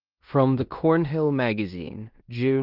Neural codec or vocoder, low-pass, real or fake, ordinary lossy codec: codec, 16 kHz in and 24 kHz out, 0.9 kbps, LongCat-Audio-Codec, fine tuned four codebook decoder; 5.4 kHz; fake; Opus, 32 kbps